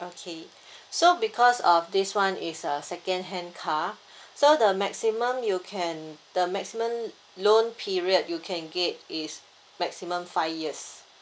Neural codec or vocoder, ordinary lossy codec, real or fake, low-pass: none; none; real; none